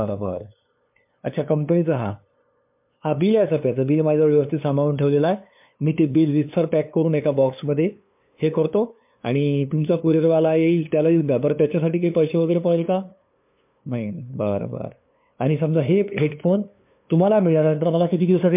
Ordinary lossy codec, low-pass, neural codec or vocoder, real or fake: MP3, 32 kbps; 3.6 kHz; codec, 16 kHz, 2 kbps, FunCodec, trained on LibriTTS, 25 frames a second; fake